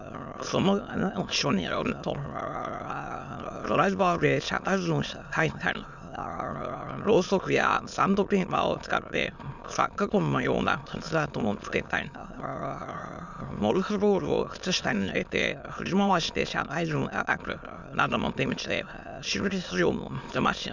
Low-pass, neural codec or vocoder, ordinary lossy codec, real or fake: 7.2 kHz; autoencoder, 22.05 kHz, a latent of 192 numbers a frame, VITS, trained on many speakers; none; fake